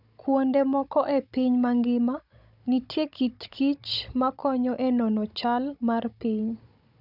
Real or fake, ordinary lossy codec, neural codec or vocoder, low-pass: fake; none; codec, 16 kHz, 16 kbps, FunCodec, trained on Chinese and English, 50 frames a second; 5.4 kHz